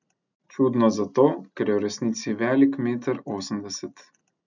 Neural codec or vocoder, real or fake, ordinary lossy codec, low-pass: none; real; none; 7.2 kHz